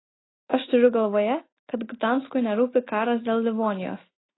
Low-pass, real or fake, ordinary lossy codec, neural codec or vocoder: 7.2 kHz; real; AAC, 16 kbps; none